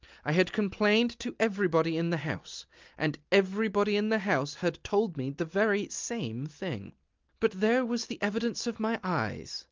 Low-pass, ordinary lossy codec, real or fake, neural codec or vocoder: 7.2 kHz; Opus, 32 kbps; real; none